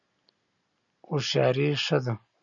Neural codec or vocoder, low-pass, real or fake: none; 7.2 kHz; real